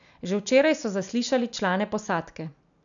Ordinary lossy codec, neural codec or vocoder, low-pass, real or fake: none; none; 7.2 kHz; real